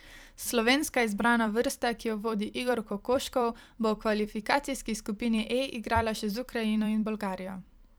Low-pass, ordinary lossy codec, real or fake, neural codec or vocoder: none; none; fake; vocoder, 44.1 kHz, 128 mel bands every 256 samples, BigVGAN v2